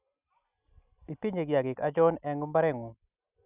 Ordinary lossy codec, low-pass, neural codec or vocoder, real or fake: none; 3.6 kHz; none; real